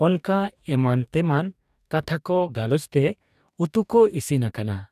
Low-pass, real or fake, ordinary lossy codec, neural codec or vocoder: 14.4 kHz; fake; none; codec, 44.1 kHz, 2.6 kbps, DAC